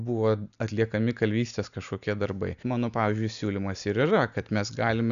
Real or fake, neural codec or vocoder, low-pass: real; none; 7.2 kHz